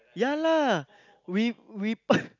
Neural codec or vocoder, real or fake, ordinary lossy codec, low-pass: none; real; none; 7.2 kHz